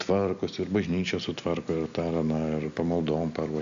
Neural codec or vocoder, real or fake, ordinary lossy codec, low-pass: none; real; AAC, 96 kbps; 7.2 kHz